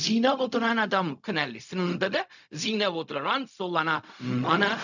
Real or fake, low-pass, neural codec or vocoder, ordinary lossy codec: fake; 7.2 kHz; codec, 16 kHz, 0.4 kbps, LongCat-Audio-Codec; none